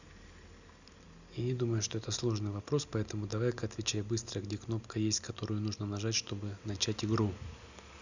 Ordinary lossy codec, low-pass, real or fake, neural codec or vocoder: none; 7.2 kHz; real; none